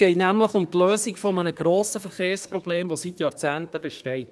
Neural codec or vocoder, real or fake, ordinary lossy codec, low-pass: codec, 24 kHz, 1 kbps, SNAC; fake; none; none